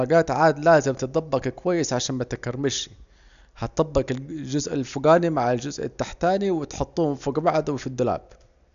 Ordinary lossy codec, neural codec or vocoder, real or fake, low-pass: none; none; real; 7.2 kHz